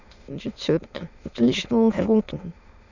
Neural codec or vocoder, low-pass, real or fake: autoencoder, 22.05 kHz, a latent of 192 numbers a frame, VITS, trained on many speakers; 7.2 kHz; fake